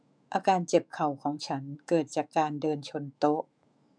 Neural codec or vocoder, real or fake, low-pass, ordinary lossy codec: autoencoder, 48 kHz, 128 numbers a frame, DAC-VAE, trained on Japanese speech; fake; 9.9 kHz; MP3, 96 kbps